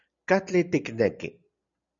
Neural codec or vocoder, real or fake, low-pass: none; real; 7.2 kHz